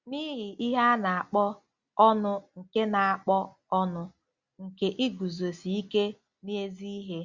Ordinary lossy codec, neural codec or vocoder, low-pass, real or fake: Opus, 64 kbps; none; 7.2 kHz; real